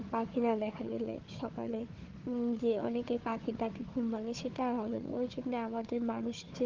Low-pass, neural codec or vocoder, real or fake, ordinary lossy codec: 7.2 kHz; codec, 16 kHz, 4 kbps, FunCodec, trained on Chinese and English, 50 frames a second; fake; Opus, 16 kbps